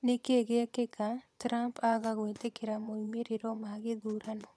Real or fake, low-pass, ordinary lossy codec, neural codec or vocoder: fake; none; none; vocoder, 22.05 kHz, 80 mel bands, Vocos